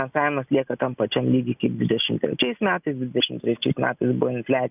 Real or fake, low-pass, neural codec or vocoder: real; 3.6 kHz; none